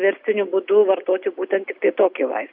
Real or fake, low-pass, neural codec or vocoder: real; 5.4 kHz; none